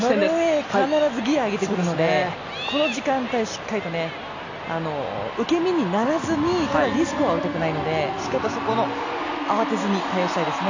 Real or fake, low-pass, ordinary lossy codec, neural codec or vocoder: real; 7.2 kHz; AAC, 48 kbps; none